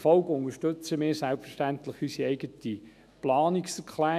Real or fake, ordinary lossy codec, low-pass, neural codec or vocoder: fake; none; 14.4 kHz; autoencoder, 48 kHz, 128 numbers a frame, DAC-VAE, trained on Japanese speech